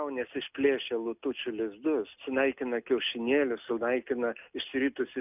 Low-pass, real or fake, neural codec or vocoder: 3.6 kHz; real; none